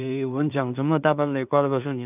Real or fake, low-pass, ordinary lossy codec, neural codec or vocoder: fake; 3.6 kHz; none; codec, 16 kHz in and 24 kHz out, 0.4 kbps, LongCat-Audio-Codec, two codebook decoder